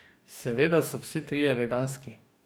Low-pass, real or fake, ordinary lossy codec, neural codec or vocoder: none; fake; none; codec, 44.1 kHz, 2.6 kbps, DAC